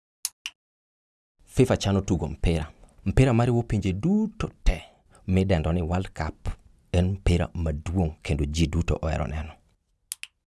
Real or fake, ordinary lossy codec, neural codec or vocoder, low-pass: real; none; none; none